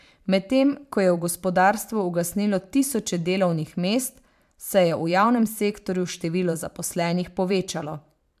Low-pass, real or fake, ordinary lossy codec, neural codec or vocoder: 14.4 kHz; fake; MP3, 96 kbps; vocoder, 44.1 kHz, 128 mel bands every 512 samples, BigVGAN v2